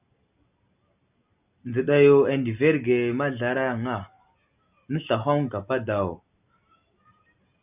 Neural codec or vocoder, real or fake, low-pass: none; real; 3.6 kHz